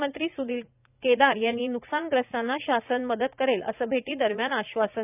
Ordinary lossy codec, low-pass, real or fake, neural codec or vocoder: none; 3.6 kHz; fake; vocoder, 44.1 kHz, 80 mel bands, Vocos